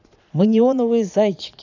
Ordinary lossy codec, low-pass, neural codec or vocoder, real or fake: none; 7.2 kHz; vocoder, 22.05 kHz, 80 mel bands, Vocos; fake